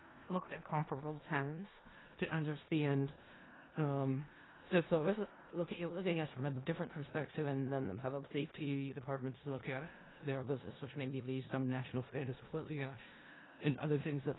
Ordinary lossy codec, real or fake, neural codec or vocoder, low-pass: AAC, 16 kbps; fake; codec, 16 kHz in and 24 kHz out, 0.4 kbps, LongCat-Audio-Codec, four codebook decoder; 7.2 kHz